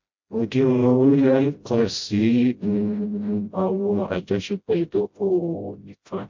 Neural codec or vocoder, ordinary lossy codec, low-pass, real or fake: codec, 16 kHz, 0.5 kbps, FreqCodec, smaller model; MP3, 48 kbps; 7.2 kHz; fake